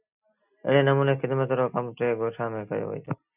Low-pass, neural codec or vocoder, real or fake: 3.6 kHz; none; real